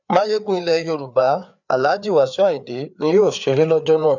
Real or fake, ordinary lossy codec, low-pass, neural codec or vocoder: fake; none; 7.2 kHz; codec, 16 kHz, 4 kbps, FreqCodec, larger model